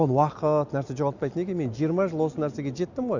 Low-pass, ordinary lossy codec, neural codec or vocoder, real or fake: 7.2 kHz; none; none; real